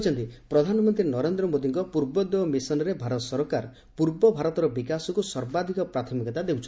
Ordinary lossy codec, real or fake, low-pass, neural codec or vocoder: none; real; none; none